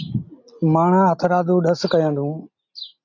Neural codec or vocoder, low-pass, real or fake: none; 7.2 kHz; real